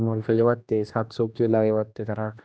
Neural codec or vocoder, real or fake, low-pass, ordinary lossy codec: codec, 16 kHz, 1 kbps, X-Codec, HuBERT features, trained on general audio; fake; none; none